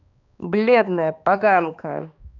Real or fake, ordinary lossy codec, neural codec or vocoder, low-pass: fake; none; codec, 16 kHz, 2 kbps, X-Codec, HuBERT features, trained on balanced general audio; 7.2 kHz